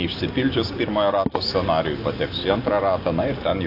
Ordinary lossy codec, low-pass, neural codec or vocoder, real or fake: AAC, 32 kbps; 5.4 kHz; none; real